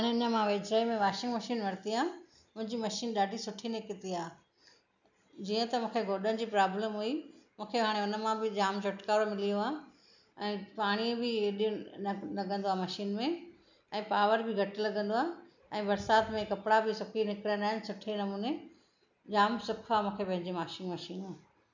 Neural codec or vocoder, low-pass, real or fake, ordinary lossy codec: none; 7.2 kHz; real; none